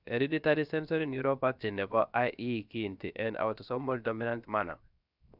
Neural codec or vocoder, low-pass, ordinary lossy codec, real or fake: codec, 16 kHz, 0.7 kbps, FocalCodec; 5.4 kHz; none; fake